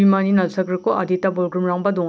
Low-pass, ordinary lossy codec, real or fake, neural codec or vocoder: none; none; real; none